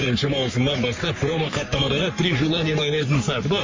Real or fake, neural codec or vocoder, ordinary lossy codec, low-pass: fake; codec, 44.1 kHz, 3.4 kbps, Pupu-Codec; MP3, 32 kbps; 7.2 kHz